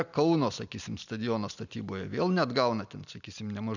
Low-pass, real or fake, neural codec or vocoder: 7.2 kHz; real; none